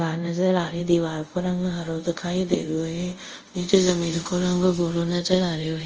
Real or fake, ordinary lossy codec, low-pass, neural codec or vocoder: fake; Opus, 24 kbps; 7.2 kHz; codec, 24 kHz, 0.5 kbps, DualCodec